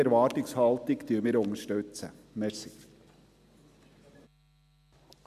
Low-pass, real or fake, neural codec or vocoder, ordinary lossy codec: 14.4 kHz; real; none; MP3, 96 kbps